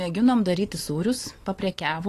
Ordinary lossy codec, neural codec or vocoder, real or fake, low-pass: AAC, 48 kbps; vocoder, 44.1 kHz, 128 mel bands every 512 samples, BigVGAN v2; fake; 14.4 kHz